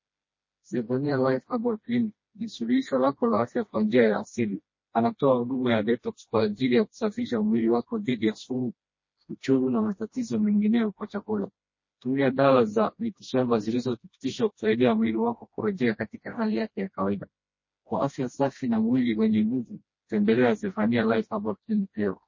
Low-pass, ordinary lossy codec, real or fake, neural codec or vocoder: 7.2 kHz; MP3, 32 kbps; fake; codec, 16 kHz, 1 kbps, FreqCodec, smaller model